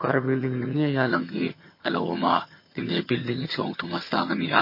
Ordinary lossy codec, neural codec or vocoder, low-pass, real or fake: MP3, 24 kbps; vocoder, 22.05 kHz, 80 mel bands, HiFi-GAN; 5.4 kHz; fake